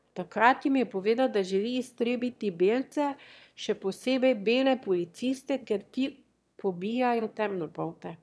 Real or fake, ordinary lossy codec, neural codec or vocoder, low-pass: fake; none; autoencoder, 22.05 kHz, a latent of 192 numbers a frame, VITS, trained on one speaker; none